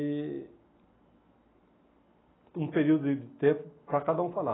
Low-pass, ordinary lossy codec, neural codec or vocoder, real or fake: 7.2 kHz; AAC, 16 kbps; none; real